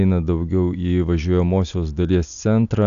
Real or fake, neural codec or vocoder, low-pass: real; none; 7.2 kHz